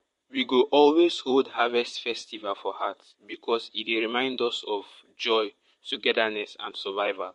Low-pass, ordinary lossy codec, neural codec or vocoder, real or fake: 14.4 kHz; MP3, 48 kbps; vocoder, 44.1 kHz, 128 mel bands, Pupu-Vocoder; fake